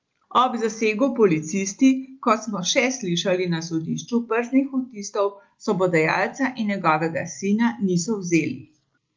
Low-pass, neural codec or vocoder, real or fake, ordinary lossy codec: 7.2 kHz; none; real; Opus, 32 kbps